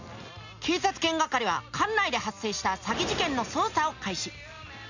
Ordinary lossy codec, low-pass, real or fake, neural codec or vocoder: none; 7.2 kHz; real; none